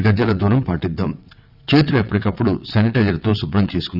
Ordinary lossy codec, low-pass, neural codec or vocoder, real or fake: none; 5.4 kHz; vocoder, 22.05 kHz, 80 mel bands, WaveNeXt; fake